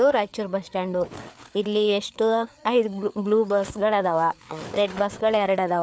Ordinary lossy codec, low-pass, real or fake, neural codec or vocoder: none; none; fake; codec, 16 kHz, 4 kbps, FreqCodec, larger model